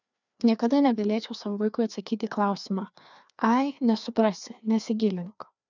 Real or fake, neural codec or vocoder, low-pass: fake; codec, 16 kHz, 2 kbps, FreqCodec, larger model; 7.2 kHz